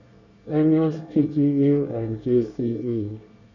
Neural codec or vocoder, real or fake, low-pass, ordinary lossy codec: codec, 24 kHz, 1 kbps, SNAC; fake; 7.2 kHz; none